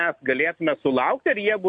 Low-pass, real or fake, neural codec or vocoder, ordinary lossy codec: 9.9 kHz; real; none; AAC, 64 kbps